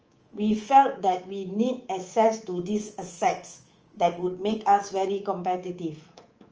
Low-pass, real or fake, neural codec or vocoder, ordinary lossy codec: 7.2 kHz; fake; codec, 24 kHz, 3.1 kbps, DualCodec; Opus, 24 kbps